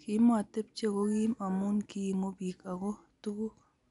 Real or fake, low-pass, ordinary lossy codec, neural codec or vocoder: real; 10.8 kHz; none; none